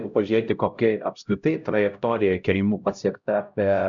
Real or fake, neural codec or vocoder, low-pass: fake; codec, 16 kHz, 0.5 kbps, X-Codec, HuBERT features, trained on LibriSpeech; 7.2 kHz